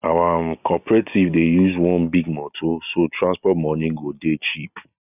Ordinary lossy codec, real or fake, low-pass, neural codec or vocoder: none; real; 3.6 kHz; none